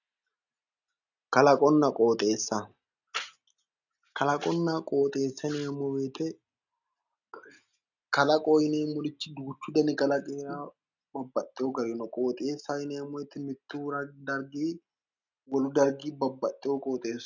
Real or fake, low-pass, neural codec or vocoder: real; 7.2 kHz; none